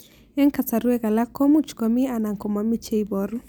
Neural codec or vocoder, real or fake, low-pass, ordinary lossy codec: none; real; none; none